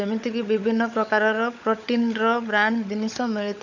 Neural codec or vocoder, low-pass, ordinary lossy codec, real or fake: codec, 16 kHz, 16 kbps, FunCodec, trained on LibriTTS, 50 frames a second; 7.2 kHz; none; fake